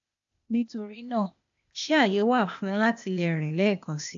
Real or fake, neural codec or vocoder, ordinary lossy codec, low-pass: fake; codec, 16 kHz, 0.8 kbps, ZipCodec; AAC, 64 kbps; 7.2 kHz